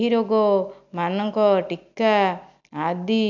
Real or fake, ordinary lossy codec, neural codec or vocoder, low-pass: real; none; none; 7.2 kHz